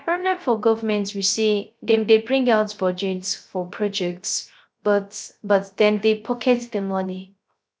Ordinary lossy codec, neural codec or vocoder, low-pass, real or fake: none; codec, 16 kHz, 0.3 kbps, FocalCodec; none; fake